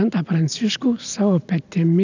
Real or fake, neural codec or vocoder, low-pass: real; none; 7.2 kHz